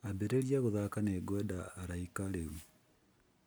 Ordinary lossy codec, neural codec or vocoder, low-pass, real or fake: none; none; none; real